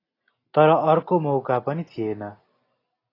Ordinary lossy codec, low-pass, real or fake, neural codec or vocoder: AAC, 24 kbps; 5.4 kHz; real; none